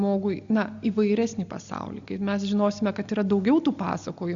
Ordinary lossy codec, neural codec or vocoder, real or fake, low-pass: Opus, 64 kbps; none; real; 7.2 kHz